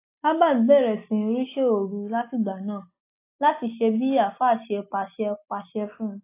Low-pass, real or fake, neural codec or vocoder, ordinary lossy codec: 3.6 kHz; real; none; AAC, 24 kbps